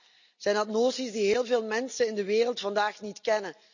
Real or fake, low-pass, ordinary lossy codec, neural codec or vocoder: real; 7.2 kHz; none; none